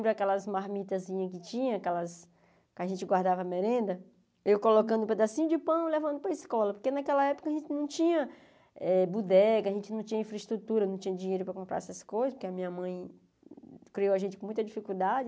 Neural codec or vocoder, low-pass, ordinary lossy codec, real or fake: none; none; none; real